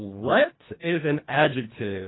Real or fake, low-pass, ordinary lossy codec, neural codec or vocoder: fake; 7.2 kHz; AAC, 16 kbps; codec, 24 kHz, 1.5 kbps, HILCodec